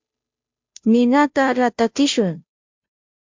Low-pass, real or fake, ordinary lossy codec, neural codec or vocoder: 7.2 kHz; fake; MP3, 64 kbps; codec, 16 kHz, 0.5 kbps, FunCodec, trained on Chinese and English, 25 frames a second